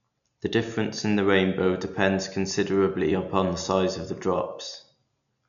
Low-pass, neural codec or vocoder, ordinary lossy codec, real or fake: 7.2 kHz; none; none; real